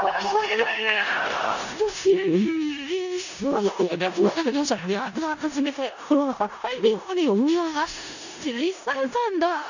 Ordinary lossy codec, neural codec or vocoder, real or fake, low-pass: none; codec, 16 kHz in and 24 kHz out, 0.4 kbps, LongCat-Audio-Codec, four codebook decoder; fake; 7.2 kHz